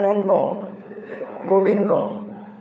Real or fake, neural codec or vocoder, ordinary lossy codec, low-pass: fake; codec, 16 kHz, 4 kbps, FunCodec, trained on LibriTTS, 50 frames a second; none; none